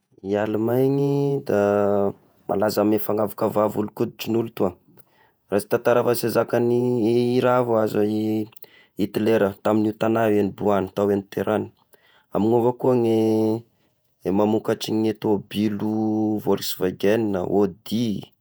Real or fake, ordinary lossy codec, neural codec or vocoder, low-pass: fake; none; vocoder, 48 kHz, 128 mel bands, Vocos; none